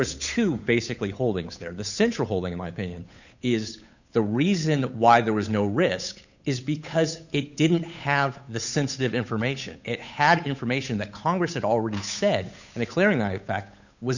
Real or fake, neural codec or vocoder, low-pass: fake; codec, 16 kHz, 8 kbps, FunCodec, trained on Chinese and English, 25 frames a second; 7.2 kHz